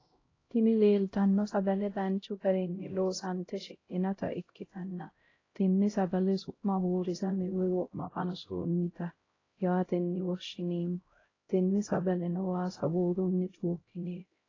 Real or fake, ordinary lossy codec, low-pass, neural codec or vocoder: fake; AAC, 32 kbps; 7.2 kHz; codec, 16 kHz, 0.5 kbps, X-Codec, HuBERT features, trained on LibriSpeech